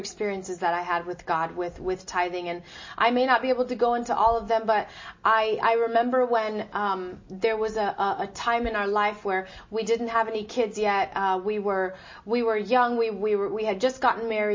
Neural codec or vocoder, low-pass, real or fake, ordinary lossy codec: none; 7.2 kHz; real; MP3, 32 kbps